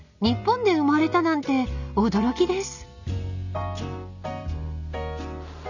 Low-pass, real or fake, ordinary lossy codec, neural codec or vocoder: 7.2 kHz; real; none; none